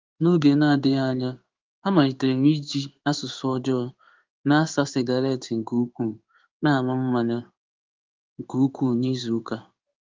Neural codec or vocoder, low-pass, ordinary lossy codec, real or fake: codec, 16 kHz in and 24 kHz out, 1 kbps, XY-Tokenizer; 7.2 kHz; Opus, 24 kbps; fake